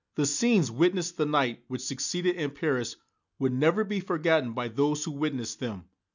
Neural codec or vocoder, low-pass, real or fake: none; 7.2 kHz; real